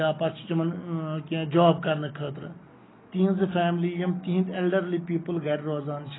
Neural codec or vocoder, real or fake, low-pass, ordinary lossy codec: none; real; 7.2 kHz; AAC, 16 kbps